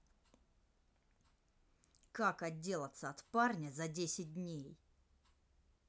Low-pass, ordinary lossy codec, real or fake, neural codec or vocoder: none; none; real; none